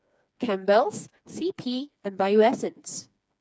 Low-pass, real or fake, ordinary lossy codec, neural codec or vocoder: none; fake; none; codec, 16 kHz, 4 kbps, FreqCodec, smaller model